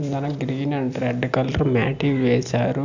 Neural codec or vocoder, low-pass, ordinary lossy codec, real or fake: none; 7.2 kHz; none; real